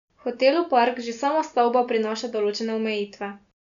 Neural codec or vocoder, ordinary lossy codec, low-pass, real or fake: none; none; 7.2 kHz; real